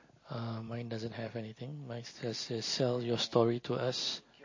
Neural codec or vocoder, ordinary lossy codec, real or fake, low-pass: none; MP3, 32 kbps; real; 7.2 kHz